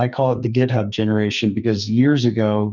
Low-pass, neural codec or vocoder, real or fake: 7.2 kHz; codec, 44.1 kHz, 2.6 kbps, SNAC; fake